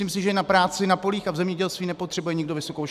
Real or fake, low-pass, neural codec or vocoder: real; 14.4 kHz; none